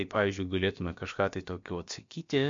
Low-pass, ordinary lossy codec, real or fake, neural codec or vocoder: 7.2 kHz; MP3, 48 kbps; fake; codec, 16 kHz, about 1 kbps, DyCAST, with the encoder's durations